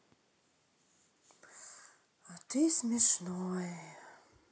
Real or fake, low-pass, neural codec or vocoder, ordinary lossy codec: real; none; none; none